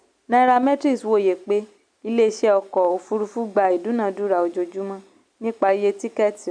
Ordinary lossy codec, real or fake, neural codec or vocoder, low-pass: none; real; none; 9.9 kHz